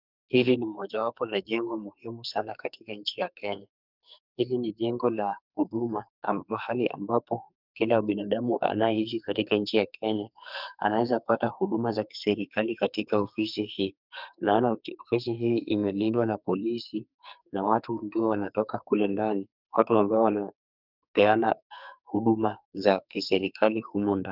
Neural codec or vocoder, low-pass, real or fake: codec, 44.1 kHz, 2.6 kbps, SNAC; 5.4 kHz; fake